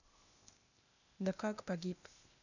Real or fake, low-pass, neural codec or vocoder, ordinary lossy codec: fake; 7.2 kHz; codec, 16 kHz, 0.8 kbps, ZipCodec; none